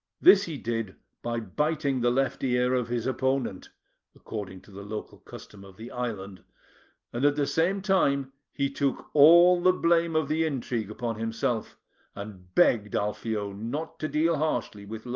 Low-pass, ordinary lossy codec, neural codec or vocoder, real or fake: 7.2 kHz; Opus, 32 kbps; none; real